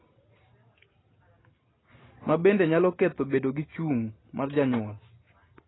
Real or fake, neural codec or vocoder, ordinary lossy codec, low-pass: real; none; AAC, 16 kbps; 7.2 kHz